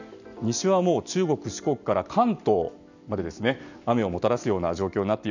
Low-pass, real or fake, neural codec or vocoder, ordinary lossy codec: 7.2 kHz; real; none; none